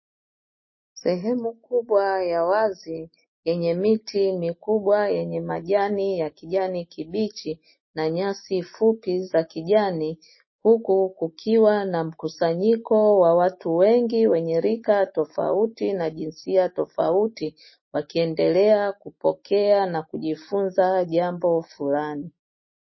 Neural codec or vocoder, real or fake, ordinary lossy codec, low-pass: none; real; MP3, 24 kbps; 7.2 kHz